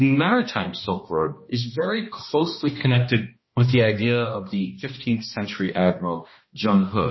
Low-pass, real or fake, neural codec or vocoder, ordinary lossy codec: 7.2 kHz; fake; codec, 16 kHz, 1 kbps, X-Codec, HuBERT features, trained on balanced general audio; MP3, 24 kbps